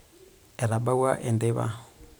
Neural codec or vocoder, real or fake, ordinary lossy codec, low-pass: vocoder, 44.1 kHz, 128 mel bands every 512 samples, BigVGAN v2; fake; none; none